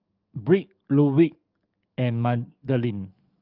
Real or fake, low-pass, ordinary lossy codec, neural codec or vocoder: fake; 5.4 kHz; Opus, 24 kbps; codec, 44.1 kHz, 7.8 kbps, DAC